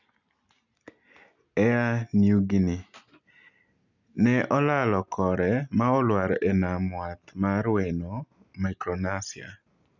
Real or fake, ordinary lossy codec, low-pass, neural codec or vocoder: real; none; 7.2 kHz; none